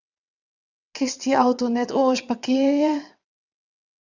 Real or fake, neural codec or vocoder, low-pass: fake; vocoder, 22.05 kHz, 80 mel bands, WaveNeXt; 7.2 kHz